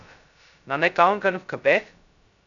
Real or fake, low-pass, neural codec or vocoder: fake; 7.2 kHz; codec, 16 kHz, 0.2 kbps, FocalCodec